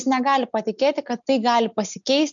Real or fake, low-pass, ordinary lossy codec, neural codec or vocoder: real; 7.2 kHz; MP3, 96 kbps; none